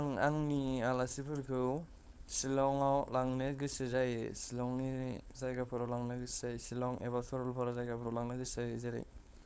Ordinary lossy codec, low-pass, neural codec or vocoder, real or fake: none; none; codec, 16 kHz, 4.8 kbps, FACodec; fake